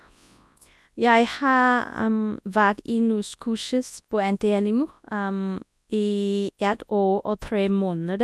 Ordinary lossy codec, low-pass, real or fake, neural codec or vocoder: none; none; fake; codec, 24 kHz, 0.9 kbps, WavTokenizer, large speech release